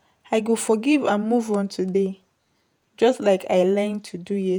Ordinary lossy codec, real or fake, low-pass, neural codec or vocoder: none; fake; none; vocoder, 48 kHz, 128 mel bands, Vocos